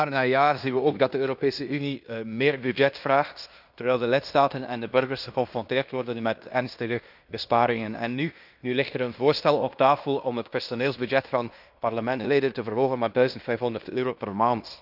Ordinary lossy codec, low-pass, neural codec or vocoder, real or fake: none; 5.4 kHz; codec, 16 kHz in and 24 kHz out, 0.9 kbps, LongCat-Audio-Codec, fine tuned four codebook decoder; fake